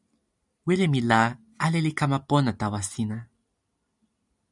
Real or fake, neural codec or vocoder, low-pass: real; none; 10.8 kHz